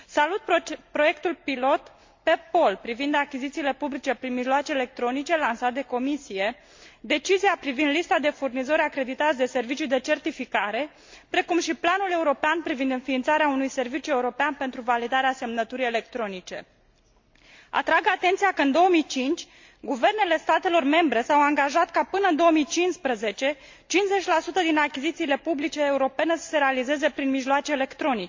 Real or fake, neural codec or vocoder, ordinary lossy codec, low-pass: real; none; MP3, 48 kbps; 7.2 kHz